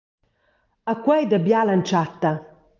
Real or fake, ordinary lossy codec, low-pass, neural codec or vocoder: real; Opus, 32 kbps; 7.2 kHz; none